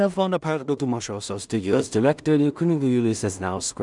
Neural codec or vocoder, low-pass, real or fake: codec, 16 kHz in and 24 kHz out, 0.4 kbps, LongCat-Audio-Codec, two codebook decoder; 10.8 kHz; fake